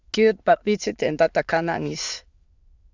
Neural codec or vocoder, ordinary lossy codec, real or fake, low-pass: autoencoder, 22.05 kHz, a latent of 192 numbers a frame, VITS, trained on many speakers; Opus, 64 kbps; fake; 7.2 kHz